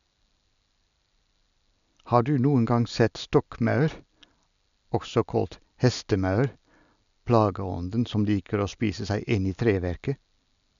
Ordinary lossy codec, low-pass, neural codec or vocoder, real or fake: none; 7.2 kHz; none; real